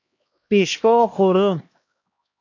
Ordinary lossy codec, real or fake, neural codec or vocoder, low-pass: MP3, 48 kbps; fake; codec, 16 kHz, 1 kbps, X-Codec, HuBERT features, trained on LibriSpeech; 7.2 kHz